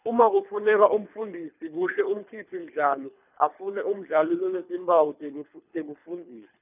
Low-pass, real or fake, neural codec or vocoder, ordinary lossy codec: 3.6 kHz; fake; codec, 24 kHz, 3 kbps, HILCodec; none